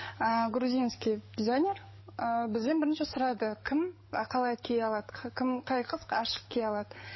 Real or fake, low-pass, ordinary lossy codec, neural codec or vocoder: fake; 7.2 kHz; MP3, 24 kbps; codec, 44.1 kHz, 7.8 kbps, DAC